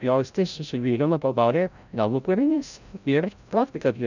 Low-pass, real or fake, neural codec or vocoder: 7.2 kHz; fake; codec, 16 kHz, 0.5 kbps, FreqCodec, larger model